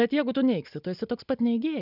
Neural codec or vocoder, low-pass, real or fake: none; 5.4 kHz; real